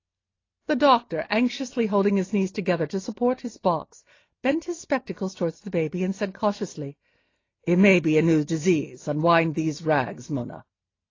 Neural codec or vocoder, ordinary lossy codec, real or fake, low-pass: none; AAC, 32 kbps; real; 7.2 kHz